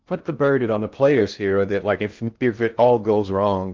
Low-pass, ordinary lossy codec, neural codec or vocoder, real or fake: 7.2 kHz; Opus, 24 kbps; codec, 16 kHz in and 24 kHz out, 0.6 kbps, FocalCodec, streaming, 2048 codes; fake